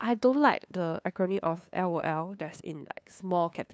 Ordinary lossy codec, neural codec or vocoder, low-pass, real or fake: none; codec, 16 kHz, 2 kbps, FunCodec, trained on LibriTTS, 25 frames a second; none; fake